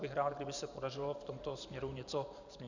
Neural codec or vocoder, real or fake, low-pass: vocoder, 44.1 kHz, 128 mel bands every 512 samples, BigVGAN v2; fake; 7.2 kHz